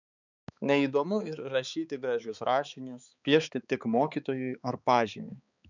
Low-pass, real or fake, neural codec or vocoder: 7.2 kHz; fake; codec, 16 kHz, 4 kbps, X-Codec, HuBERT features, trained on balanced general audio